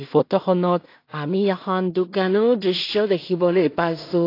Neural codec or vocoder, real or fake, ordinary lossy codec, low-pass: codec, 16 kHz in and 24 kHz out, 0.4 kbps, LongCat-Audio-Codec, two codebook decoder; fake; AAC, 32 kbps; 5.4 kHz